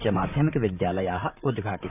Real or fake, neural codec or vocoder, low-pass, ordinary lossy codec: fake; vocoder, 44.1 kHz, 128 mel bands, Pupu-Vocoder; 3.6 kHz; none